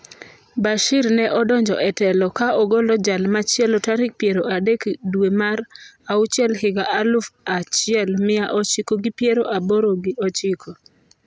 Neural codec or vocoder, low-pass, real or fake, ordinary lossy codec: none; none; real; none